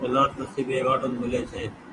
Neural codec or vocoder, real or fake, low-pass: vocoder, 24 kHz, 100 mel bands, Vocos; fake; 10.8 kHz